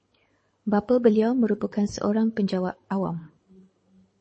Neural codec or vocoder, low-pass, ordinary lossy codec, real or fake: codec, 24 kHz, 6 kbps, HILCodec; 9.9 kHz; MP3, 32 kbps; fake